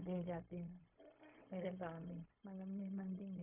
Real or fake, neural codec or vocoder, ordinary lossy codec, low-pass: fake; codec, 16 kHz, 0.4 kbps, LongCat-Audio-Codec; AAC, 32 kbps; 3.6 kHz